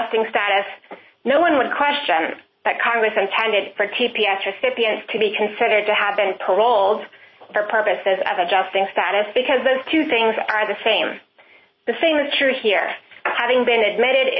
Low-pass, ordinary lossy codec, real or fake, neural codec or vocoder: 7.2 kHz; MP3, 24 kbps; real; none